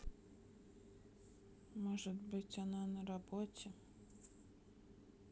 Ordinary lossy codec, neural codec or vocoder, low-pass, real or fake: none; none; none; real